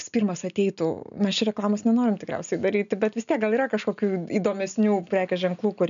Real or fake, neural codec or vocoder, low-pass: real; none; 7.2 kHz